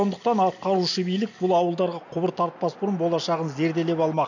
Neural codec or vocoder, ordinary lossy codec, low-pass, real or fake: none; none; 7.2 kHz; real